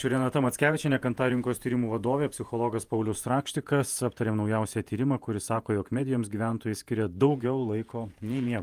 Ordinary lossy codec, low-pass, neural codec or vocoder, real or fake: Opus, 32 kbps; 14.4 kHz; vocoder, 48 kHz, 128 mel bands, Vocos; fake